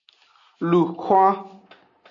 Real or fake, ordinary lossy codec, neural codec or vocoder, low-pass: real; AAC, 64 kbps; none; 7.2 kHz